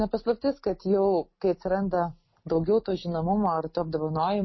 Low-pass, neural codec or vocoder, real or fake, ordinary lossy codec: 7.2 kHz; none; real; MP3, 24 kbps